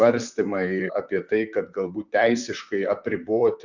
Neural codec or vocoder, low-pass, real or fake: vocoder, 44.1 kHz, 128 mel bands, Pupu-Vocoder; 7.2 kHz; fake